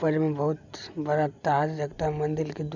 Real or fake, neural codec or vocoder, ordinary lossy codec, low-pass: real; none; none; 7.2 kHz